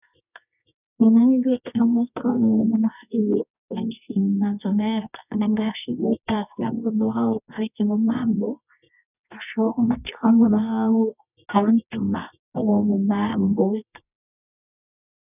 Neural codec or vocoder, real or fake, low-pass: codec, 24 kHz, 0.9 kbps, WavTokenizer, medium music audio release; fake; 3.6 kHz